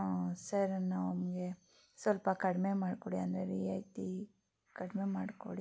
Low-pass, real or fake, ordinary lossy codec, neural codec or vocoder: none; real; none; none